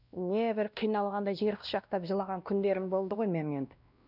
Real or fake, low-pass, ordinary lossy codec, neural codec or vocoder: fake; 5.4 kHz; none; codec, 16 kHz, 1 kbps, X-Codec, WavLM features, trained on Multilingual LibriSpeech